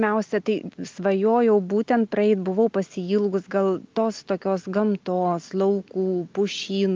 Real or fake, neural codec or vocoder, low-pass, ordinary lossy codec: real; none; 7.2 kHz; Opus, 24 kbps